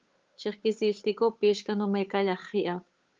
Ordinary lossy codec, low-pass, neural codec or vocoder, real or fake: Opus, 32 kbps; 7.2 kHz; codec, 16 kHz, 8 kbps, FunCodec, trained on Chinese and English, 25 frames a second; fake